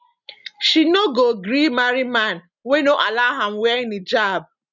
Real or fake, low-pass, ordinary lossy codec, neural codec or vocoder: real; 7.2 kHz; none; none